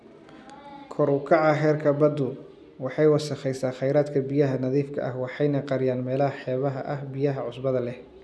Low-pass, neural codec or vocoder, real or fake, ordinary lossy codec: none; none; real; none